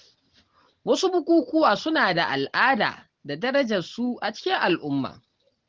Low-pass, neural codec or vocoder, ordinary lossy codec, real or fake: 7.2 kHz; none; Opus, 16 kbps; real